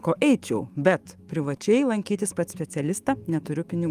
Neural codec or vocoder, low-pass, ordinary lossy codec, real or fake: autoencoder, 48 kHz, 128 numbers a frame, DAC-VAE, trained on Japanese speech; 14.4 kHz; Opus, 32 kbps; fake